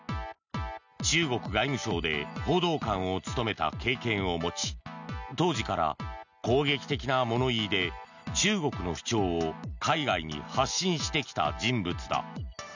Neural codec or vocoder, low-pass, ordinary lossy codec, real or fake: none; 7.2 kHz; none; real